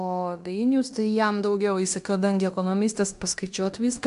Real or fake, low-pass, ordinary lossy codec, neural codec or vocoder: fake; 10.8 kHz; AAC, 96 kbps; codec, 16 kHz in and 24 kHz out, 0.9 kbps, LongCat-Audio-Codec, fine tuned four codebook decoder